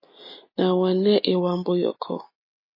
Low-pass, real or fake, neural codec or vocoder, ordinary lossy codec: 5.4 kHz; real; none; MP3, 24 kbps